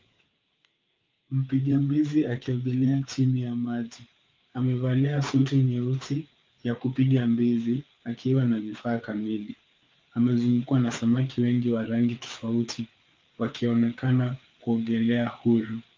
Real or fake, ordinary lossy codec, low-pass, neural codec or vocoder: fake; Opus, 24 kbps; 7.2 kHz; codec, 16 kHz, 4 kbps, FreqCodec, larger model